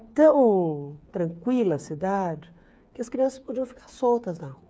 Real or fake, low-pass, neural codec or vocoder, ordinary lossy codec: fake; none; codec, 16 kHz, 8 kbps, FreqCodec, smaller model; none